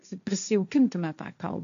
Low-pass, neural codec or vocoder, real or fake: 7.2 kHz; codec, 16 kHz, 1.1 kbps, Voila-Tokenizer; fake